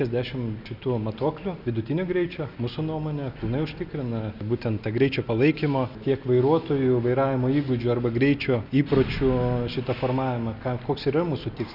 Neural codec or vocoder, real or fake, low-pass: none; real; 5.4 kHz